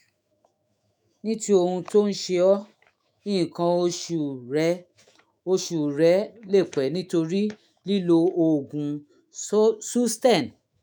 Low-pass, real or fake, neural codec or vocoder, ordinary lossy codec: none; fake; autoencoder, 48 kHz, 128 numbers a frame, DAC-VAE, trained on Japanese speech; none